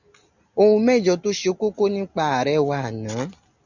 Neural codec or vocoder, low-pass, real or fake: none; 7.2 kHz; real